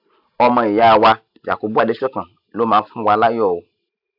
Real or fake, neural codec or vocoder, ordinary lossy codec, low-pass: real; none; AAC, 48 kbps; 5.4 kHz